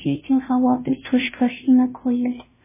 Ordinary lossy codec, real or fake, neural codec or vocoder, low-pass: MP3, 16 kbps; fake; codec, 16 kHz, 0.5 kbps, FunCodec, trained on Chinese and English, 25 frames a second; 3.6 kHz